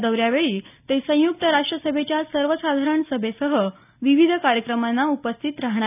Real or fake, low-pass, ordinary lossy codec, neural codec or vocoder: real; 3.6 kHz; none; none